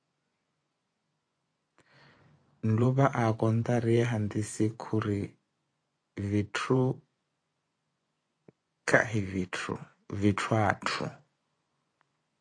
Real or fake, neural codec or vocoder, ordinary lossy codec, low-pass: fake; vocoder, 24 kHz, 100 mel bands, Vocos; AAC, 48 kbps; 9.9 kHz